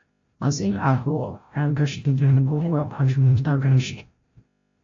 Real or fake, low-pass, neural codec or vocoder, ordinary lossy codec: fake; 7.2 kHz; codec, 16 kHz, 0.5 kbps, FreqCodec, larger model; AAC, 48 kbps